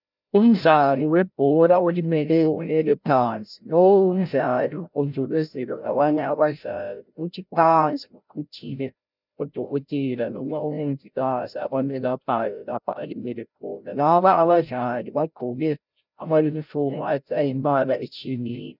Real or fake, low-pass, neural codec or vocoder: fake; 5.4 kHz; codec, 16 kHz, 0.5 kbps, FreqCodec, larger model